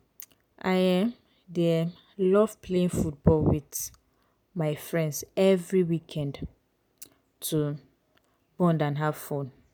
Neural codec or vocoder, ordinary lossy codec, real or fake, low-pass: none; none; real; none